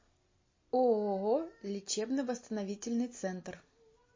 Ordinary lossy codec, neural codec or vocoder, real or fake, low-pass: MP3, 32 kbps; none; real; 7.2 kHz